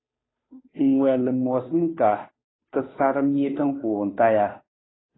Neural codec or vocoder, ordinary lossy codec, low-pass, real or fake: codec, 16 kHz, 2 kbps, FunCodec, trained on Chinese and English, 25 frames a second; AAC, 16 kbps; 7.2 kHz; fake